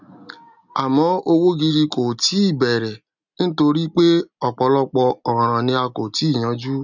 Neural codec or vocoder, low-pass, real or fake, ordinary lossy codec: none; 7.2 kHz; real; none